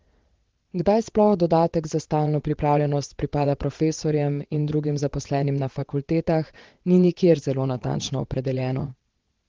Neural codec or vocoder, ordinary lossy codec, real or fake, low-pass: vocoder, 22.05 kHz, 80 mel bands, WaveNeXt; Opus, 16 kbps; fake; 7.2 kHz